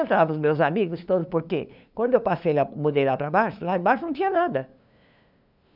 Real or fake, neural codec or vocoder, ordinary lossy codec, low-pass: fake; codec, 16 kHz, 2 kbps, FunCodec, trained on LibriTTS, 25 frames a second; none; 5.4 kHz